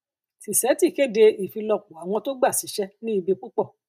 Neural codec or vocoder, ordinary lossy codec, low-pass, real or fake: none; none; 14.4 kHz; real